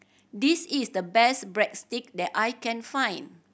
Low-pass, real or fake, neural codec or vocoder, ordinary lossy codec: none; real; none; none